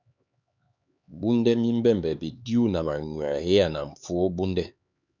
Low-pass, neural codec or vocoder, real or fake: 7.2 kHz; codec, 16 kHz, 4 kbps, X-Codec, HuBERT features, trained on LibriSpeech; fake